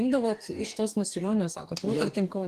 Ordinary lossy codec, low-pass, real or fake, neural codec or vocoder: Opus, 24 kbps; 14.4 kHz; fake; codec, 44.1 kHz, 2.6 kbps, DAC